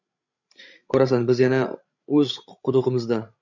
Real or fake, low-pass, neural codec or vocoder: fake; 7.2 kHz; codec, 16 kHz, 16 kbps, FreqCodec, larger model